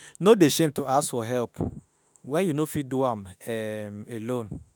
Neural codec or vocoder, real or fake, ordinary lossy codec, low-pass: autoencoder, 48 kHz, 32 numbers a frame, DAC-VAE, trained on Japanese speech; fake; none; none